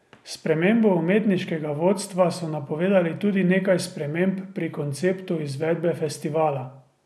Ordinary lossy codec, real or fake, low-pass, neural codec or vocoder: none; real; none; none